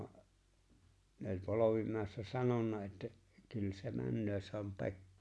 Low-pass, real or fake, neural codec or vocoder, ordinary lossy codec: none; real; none; none